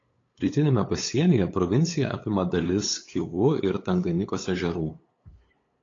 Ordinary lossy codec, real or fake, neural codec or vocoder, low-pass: AAC, 32 kbps; fake; codec, 16 kHz, 8 kbps, FunCodec, trained on LibriTTS, 25 frames a second; 7.2 kHz